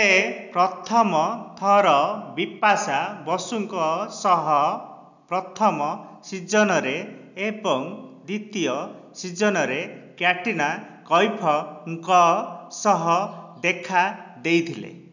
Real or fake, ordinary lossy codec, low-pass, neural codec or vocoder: real; none; 7.2 kHz; none